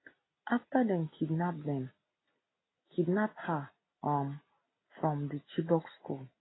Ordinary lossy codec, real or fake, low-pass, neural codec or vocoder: AAC, 16 kbps; real; 7.2 kHz; none